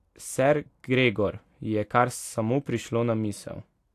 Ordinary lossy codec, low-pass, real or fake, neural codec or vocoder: AAC, 64 kbps; 14.4 kHz; real; none